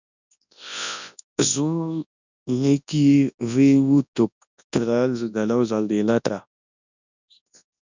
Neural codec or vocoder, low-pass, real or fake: codec, 24 kHz, 0.9 kbps, WavTokenizer, large speech release; 7.2 kHz; fake